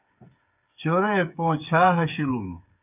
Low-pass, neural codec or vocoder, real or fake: 3.6 kHz; codec, 16 kHz, 8 kbps, FreqCodec, smaller model; fake